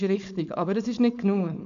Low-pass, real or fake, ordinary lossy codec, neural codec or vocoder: 7.2 kHz; fake; MP3, 96 kbps; codec, 16 kHz, 4.8 kbps, FACodec